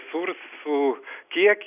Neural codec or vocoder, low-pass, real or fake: none; 3.6 kHz; real